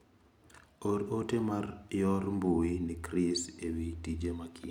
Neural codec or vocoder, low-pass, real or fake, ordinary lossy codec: none; 19.8 kHz; real; none